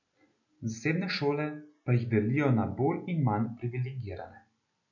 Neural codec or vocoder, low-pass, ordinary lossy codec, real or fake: none; 7.2 kHz; none; real